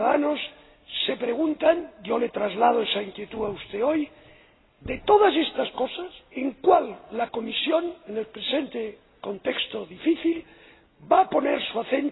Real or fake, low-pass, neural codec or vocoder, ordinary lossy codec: real; 7.2 kHz; none; AAC, 16 kbps